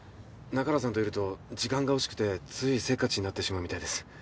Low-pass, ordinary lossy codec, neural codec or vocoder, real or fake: none; none; none; real